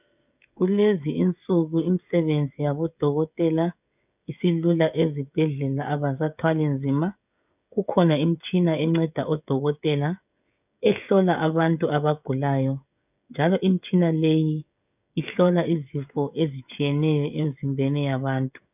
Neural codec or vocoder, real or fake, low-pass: codec, 16 kHz, 16 kbps, FreqCodec, smaller model; fake; 3.6 kHz